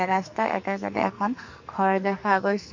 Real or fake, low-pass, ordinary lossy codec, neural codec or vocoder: fake; 7.2 kHz; MP3, 48 kbps; codec, 44.1 kHz, 2.6 kbps, SNAC